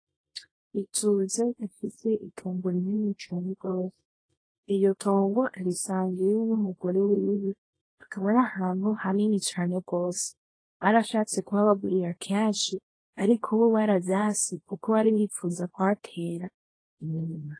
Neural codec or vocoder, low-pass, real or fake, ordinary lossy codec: codec, 24 kHz, 0.9 kbps, WavTokenizer, small release; 9.9 kHz; fake; AAC, 32 kbps